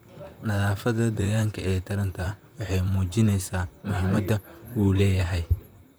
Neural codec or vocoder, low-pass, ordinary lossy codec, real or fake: vocoder, 44.1 kHz, 128 mel bands, Pupu-Vocoder; none; none; fake